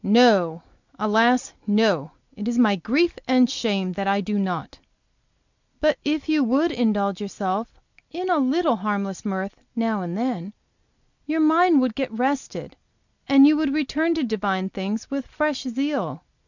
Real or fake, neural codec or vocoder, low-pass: real; none; 7.2 kHz